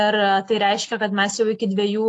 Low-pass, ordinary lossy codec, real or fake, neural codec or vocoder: 10.8 kHz; AAC, 48 kbps; real; none